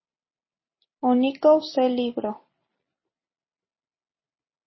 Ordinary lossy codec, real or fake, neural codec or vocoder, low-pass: MP3, 24 kbps; real; none; 7.2 kHz